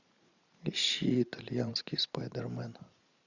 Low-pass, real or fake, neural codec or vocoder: 7.2 kHz; fake; vocoder, 44.1 kHz, 128 mel bands every 512 samples, BigVGAN v2